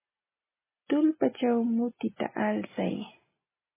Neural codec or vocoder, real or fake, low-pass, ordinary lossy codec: none; real; 3.6 kHz; MP3, 16 kbps